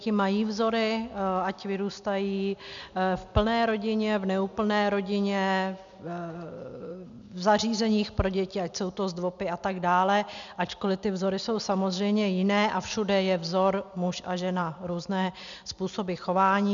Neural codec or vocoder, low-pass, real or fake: none; 7.2 kHz; real